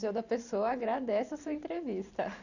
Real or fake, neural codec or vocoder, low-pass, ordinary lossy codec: fake; vocoder, 44.1 kHz, 128 mel bands every 512 samples, BigVGAN v2; 7.2 kHz; none